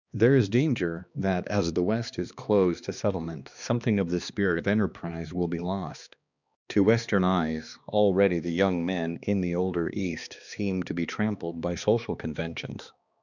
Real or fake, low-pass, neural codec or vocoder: fake; 7.2 kHz; codec, 16 kHz, 2 kbps, X-Codec, HuBERT features, trained on balanced general audio